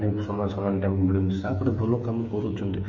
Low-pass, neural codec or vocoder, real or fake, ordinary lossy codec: 7.2 kHz; codec, 16 kHz, 8 kbps, FreqCodec, smaller model; fake; MP3, 32 kbps